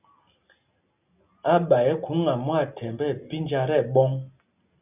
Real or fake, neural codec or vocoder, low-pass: real; none; 3.6 kHz